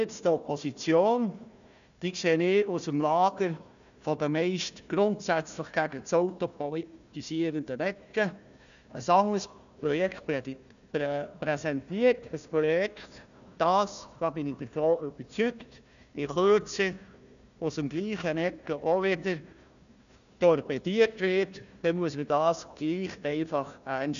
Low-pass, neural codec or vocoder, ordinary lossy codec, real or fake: 7.2 kHz; codec, 16 kHz, 1 kbps, FunCodec, trained on Chinese and English, 50 frames a second; none; fake